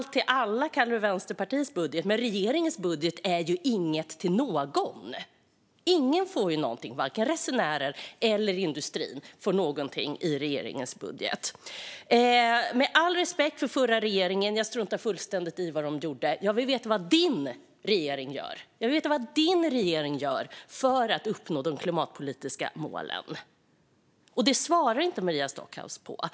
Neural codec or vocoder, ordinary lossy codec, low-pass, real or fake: none; none; none; real